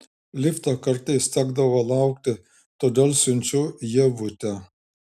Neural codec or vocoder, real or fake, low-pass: none; real; 14.4 kHz